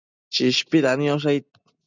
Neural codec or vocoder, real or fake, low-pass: none; real; 7.2 kHz